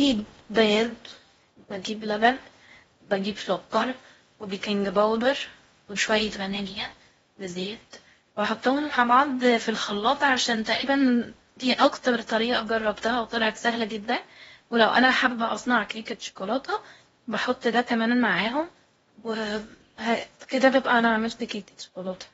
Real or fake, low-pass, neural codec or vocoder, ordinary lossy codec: fake; 10.8 kHz; codec, 16 kHz in and 24 kHz out, 0.6 kbps, FocalCodec, streaming, 4096 codes; AAC, 24 kbps